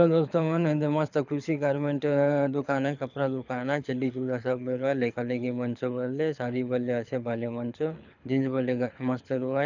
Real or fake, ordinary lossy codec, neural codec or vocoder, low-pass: fake; none; codec, 24 kHz, 6 kbps, HILCodec; 7.2 kHz